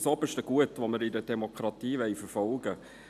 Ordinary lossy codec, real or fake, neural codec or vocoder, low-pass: none; real; none; 14.4 kHz